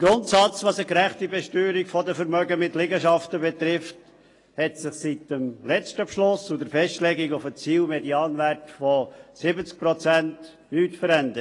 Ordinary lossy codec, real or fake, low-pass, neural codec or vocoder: AAC, 32 kbps; real; 10.8 kHz; none